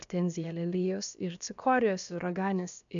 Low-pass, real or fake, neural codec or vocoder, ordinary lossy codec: 7.2 kHz; fake; codec, 16 kHz, about 1 kbps, DyCAST, with the encoder's durations; MP3, 96 kbps